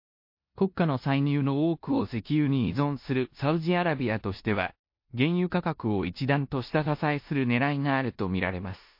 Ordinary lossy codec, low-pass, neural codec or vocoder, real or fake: MP3, 32 kbps; 5.4 kHz; codec, 16 kHz in and 24 kHz out, 0.9 kbps, LongCat-Audio-Codec, four codebook decoder; fake